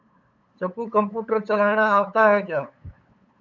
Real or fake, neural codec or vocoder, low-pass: fake; codec, 16 kHz, 8 kbps, FunCodec, trained on LibriTTS, 25 frames a second; 7.2 kHz